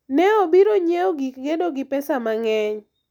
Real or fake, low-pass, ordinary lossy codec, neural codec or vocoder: real; 19.8 kHz; none; none